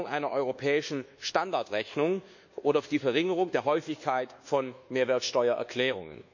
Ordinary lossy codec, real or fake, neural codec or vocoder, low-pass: none; fake; codec, 24 kHz, 1.2 kbps, DualCodec; 7.2 kHz